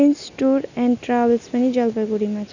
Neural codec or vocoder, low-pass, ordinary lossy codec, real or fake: none; 7.2 kHz; none; real